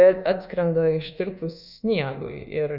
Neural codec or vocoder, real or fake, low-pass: codec, 24 kHz, 1.2 kbps, DualCodec; fake; 5.4 kHz